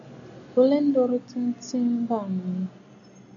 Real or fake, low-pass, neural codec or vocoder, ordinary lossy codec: real; 7.2 kHz; none; AAC, 64 kbps